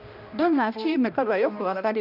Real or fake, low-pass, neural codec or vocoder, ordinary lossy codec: fake; 5.4 kHz; codec, 16 kHz, 1 kbps, X-Codec, HuBERT features, trained on balanced general audio; none